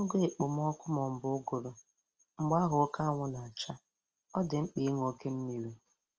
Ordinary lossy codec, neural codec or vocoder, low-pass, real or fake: Opus, 24 kbps; none; 7.2 kHz; real